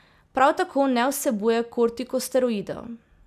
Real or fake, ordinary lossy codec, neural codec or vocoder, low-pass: real; none; none; 14.4 kHz